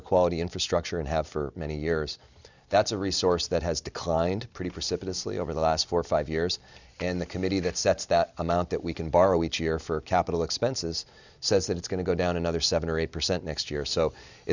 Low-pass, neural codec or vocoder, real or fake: 7.2 kHz; none; real